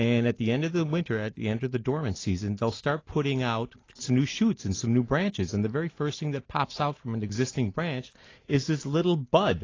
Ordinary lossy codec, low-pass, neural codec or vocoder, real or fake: AAC, 32 kbps; 7.2 kHz; none; real